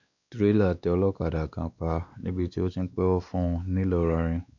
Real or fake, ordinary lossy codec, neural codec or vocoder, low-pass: fake; none; codec, 16 kHz, 2 kbps, X-Codec, WavLM features, trained on Multilingual LibriSpeech; 7.2 kHz